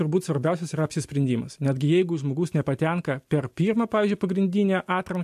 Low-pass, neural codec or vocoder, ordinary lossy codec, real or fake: 14.4 kHz; none; MP3, 64 kbps; real